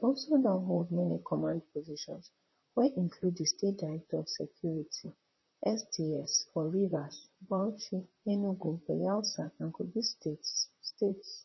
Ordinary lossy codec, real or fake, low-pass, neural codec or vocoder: MP3, 24 kbps; fake; 7.2 kHz; vocoder, 44.1 kHz, 128 mel bands, Pupu-Vocoder